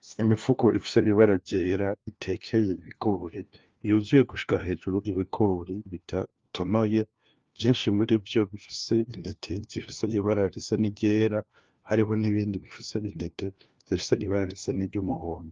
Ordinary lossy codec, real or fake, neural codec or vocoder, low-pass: Opus, 32 kbps; fake; codec, 16 kHz, 1 kbps, FunCodec, trained on LibriTTS, 50 frames a second; 7.2 kHz